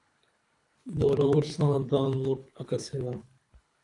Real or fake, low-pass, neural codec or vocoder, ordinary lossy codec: fake; 10.8 kHz; codec, 24 kHz, 3 kbps, HILCodec; AAC, 48 kbps